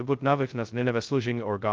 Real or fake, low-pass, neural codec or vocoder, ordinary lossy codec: fake; 7.2 kHz; codec, 16 kHz, 0.2 kbps, FocalCodec; Opus, 32 kbps